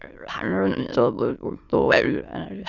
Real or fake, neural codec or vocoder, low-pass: fake; autoencoder, 22.05 kHz, a latent of 192 numbers a frame, VITS, trained on many speakers; 7.2 kHz